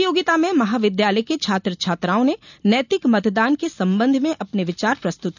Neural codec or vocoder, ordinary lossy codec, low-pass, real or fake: none; none; 7.2 kHz; real